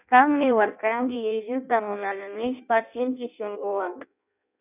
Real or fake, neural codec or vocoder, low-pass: fake; codec, 16 kHz in and 24 kHz out, 0.6 kbps, FireRedTTS-2 codec; 3.6 kHz